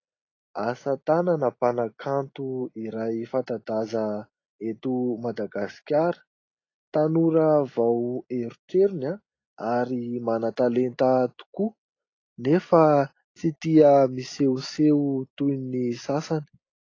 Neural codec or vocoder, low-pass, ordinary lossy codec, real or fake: none; 7.2 kHz; AAC, 32 kbps; real